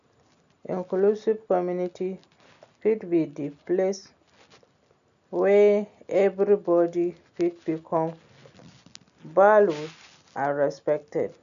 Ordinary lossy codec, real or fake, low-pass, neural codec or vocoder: none; real; 7.2 kHz; none